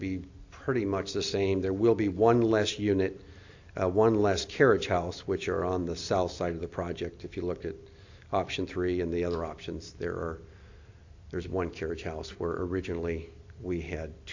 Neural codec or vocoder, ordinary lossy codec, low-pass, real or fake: none; AAC, 48 kbps; 7.2 kHz; real